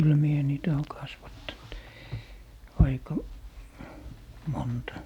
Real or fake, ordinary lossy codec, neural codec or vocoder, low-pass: real; none; none; 19.8 kHz